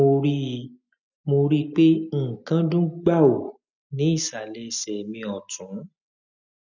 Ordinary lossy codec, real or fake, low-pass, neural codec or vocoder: none; real; 7.2 kHz; none